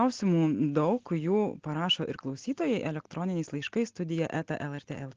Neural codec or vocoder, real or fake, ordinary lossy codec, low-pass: none; real; Opus, 16 kbps; 7.2 kHz